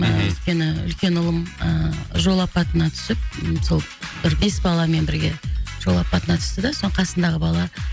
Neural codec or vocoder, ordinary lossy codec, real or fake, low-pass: none; none; real; none